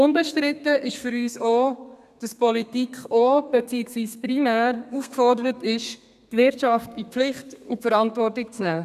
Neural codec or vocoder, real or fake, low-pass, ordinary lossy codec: codec, 32 kHz, 1.9 kbps, SNAC; fake; 14.4 kHz; none